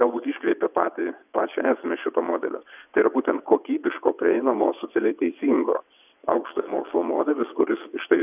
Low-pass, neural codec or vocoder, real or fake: 3.6 kHz; vocoder, 22.05 kHz, 80 mel bands, WaveNeXt; fake